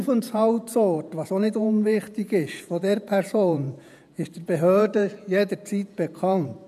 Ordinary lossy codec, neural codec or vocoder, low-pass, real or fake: none; vocoder, 48 kHz, 128 mel bands, Vocos; 14.4 kHz; fake